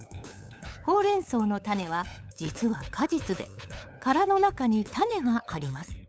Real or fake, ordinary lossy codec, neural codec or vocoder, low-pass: fake; none; codec, 16 kHz, 8 kbps, FunCodec, trained on LibriTTS, 25 frames a second; none